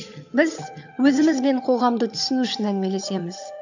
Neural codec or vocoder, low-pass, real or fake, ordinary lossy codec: vocoder, 22.05 kHz, 80 mel bands, HiFi-GAN; 7.2 kHz; fake; none